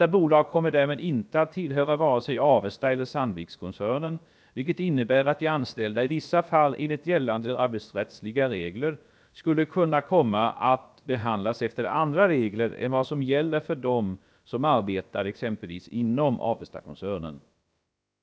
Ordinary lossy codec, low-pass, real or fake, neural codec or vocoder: none; none; fake; codec, 16 kHz, about 1 kbps, DyCAST, with the encoder's durations